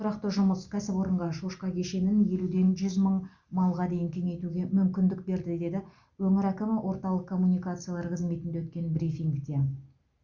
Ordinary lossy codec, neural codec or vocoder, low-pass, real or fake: Opus, 64 kbps; none; 7.2 kHz; real